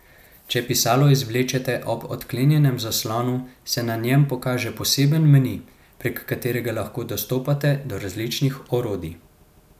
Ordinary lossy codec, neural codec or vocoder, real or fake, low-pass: none; none; real; 14.4 kHz